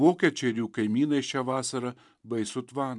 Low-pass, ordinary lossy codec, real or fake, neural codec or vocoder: 10.8 kHz; MP3, 96 kbps; fake; vocoder, 44.1 kHz, 128 mel bands every 512 samples, BigVGAN v2